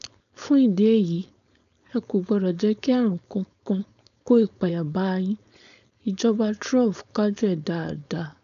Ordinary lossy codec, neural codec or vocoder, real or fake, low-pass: none; codec, 16 kHz, 4.8 kbps, FACodec; fake; 7.2 kHz